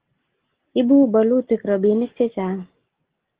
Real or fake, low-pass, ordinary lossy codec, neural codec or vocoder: real; 3.6 kHz; Opus, 16 kbps; none